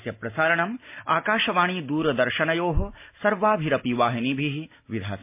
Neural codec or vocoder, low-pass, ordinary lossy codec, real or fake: none; 3.6 kHz; MP3, 24 kbps; real